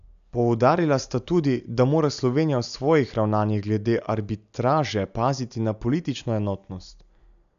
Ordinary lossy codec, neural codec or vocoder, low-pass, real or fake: AAC, 96 kbps; none; 7.2 kHz; real